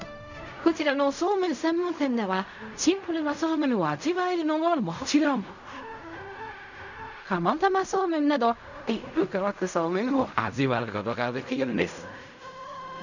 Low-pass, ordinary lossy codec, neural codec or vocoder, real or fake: 7.2 kHz; none; codec, 16 kHz in and 24 kHz out, 0.4 kbps, LongCat-Audio-Codec, fine tuned four codebook decoder; fake